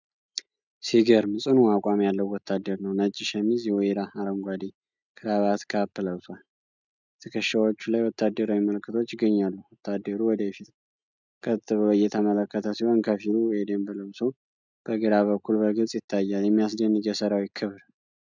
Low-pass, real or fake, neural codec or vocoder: 7.2 kHz; real; none